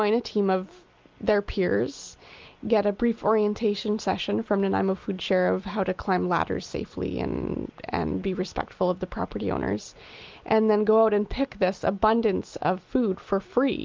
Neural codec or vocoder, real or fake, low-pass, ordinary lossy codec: none; real; 7.2 kHz; Opus, 24 kbps